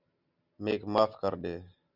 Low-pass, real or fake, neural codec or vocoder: 5.4 kHz; real; none